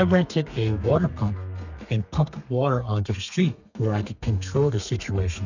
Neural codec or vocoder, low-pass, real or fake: codec, 32 kHz, 1.9 kbps, SNAC; 7.2 kHz; fake